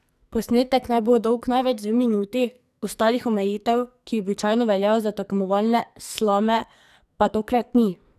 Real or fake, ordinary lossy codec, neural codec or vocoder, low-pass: fake; none; codec, 44.1 kHz, 2.6 kbps, SNAC; 14.4 kHz